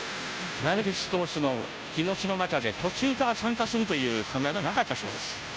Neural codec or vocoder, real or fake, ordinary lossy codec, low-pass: codec, 16 kHz, 0.5 kbps, FunCodec, trained on Chinese and English, 25 frames a second; fake; none; none